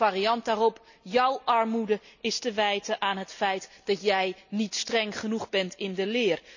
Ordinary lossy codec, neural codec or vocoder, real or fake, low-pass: none; none; real; 7.2 kHz